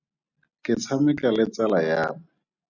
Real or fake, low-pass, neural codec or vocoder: real; 7.2 kHz; none